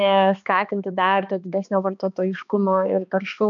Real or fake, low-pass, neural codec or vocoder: fake; 7.2 kHz; codec, 16 kHz, 2 kbps, X-Codec, HuBERT features, trained on balanced general audio